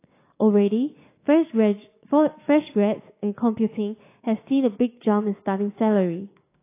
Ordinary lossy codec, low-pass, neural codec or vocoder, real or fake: AAC, 24 kbps; 3.6 kHz; codec, 16 kHz, 6 kbps, DAC; fake